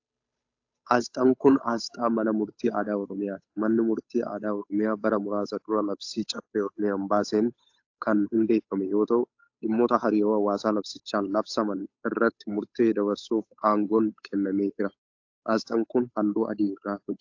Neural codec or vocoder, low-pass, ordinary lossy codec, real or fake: codec, 16 kHz, 8 kbps, FunCodec, trained on Chinese and English, 25 frames a second; 7.2 kHz; AAC, 48 kbps; fake